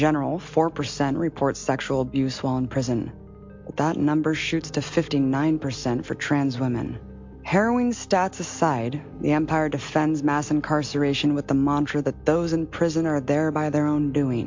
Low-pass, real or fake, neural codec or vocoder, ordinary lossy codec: 7.2 kHz; real; none; MP3, 48 kbps